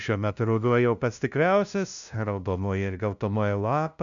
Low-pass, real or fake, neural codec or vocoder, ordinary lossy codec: 7.2 kHz; fake; codec, 16 kHz, 0.5 kbps, FunCodec, trained on LibriTTS, 25 frames a second; MP3, 96 kbps